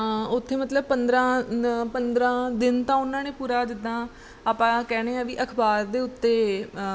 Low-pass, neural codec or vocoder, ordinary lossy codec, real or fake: none; none; none; real